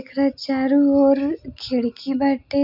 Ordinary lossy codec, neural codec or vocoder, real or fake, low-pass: none; none; real; 5.4 kHz